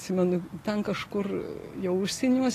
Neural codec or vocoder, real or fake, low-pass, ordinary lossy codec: none; real; 14.4 kHz; AAC, 48 kbps